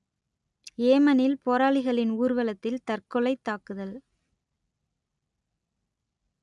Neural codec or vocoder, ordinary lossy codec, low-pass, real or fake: none; none; 10.8 kHz; real